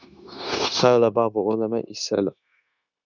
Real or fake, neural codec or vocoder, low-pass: fake; codec, 16 kHz, 0.9 kbps, LongCat-Audio-Codec; 7.2 kHz